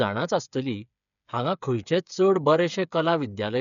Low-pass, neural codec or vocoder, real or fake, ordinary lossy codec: 7.2 kHz; codec, 16 kHz, 8 kbps, FreqCodec, smaller model; fake; none